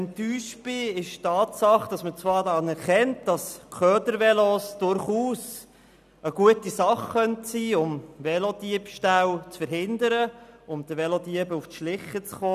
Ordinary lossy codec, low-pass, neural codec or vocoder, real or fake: none; 14.4 kHz; none; real